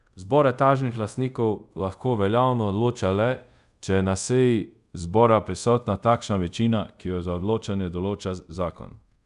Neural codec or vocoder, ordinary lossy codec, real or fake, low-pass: codec, 24 kHz, 0.5 kbps, DualCodec; none; fake; 10.8 kHz